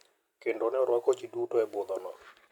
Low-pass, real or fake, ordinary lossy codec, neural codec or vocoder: 19.8 kHz; real; none; none